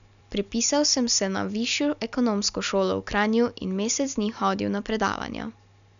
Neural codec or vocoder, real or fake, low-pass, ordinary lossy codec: none; real; 7.2 kHz; none